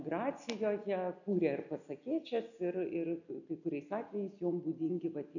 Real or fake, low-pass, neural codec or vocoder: real; 7.2 kHz; none